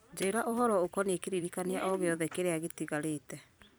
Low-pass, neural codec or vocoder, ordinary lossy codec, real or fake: none; vocoder, 44.1 kHz, 128 mel bands every 256 samples, BigVGAN v2; none; fake